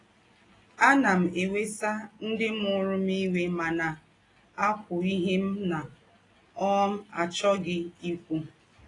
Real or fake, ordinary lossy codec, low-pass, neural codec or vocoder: real; AAC, 32 kbps; 10.8 kHz; none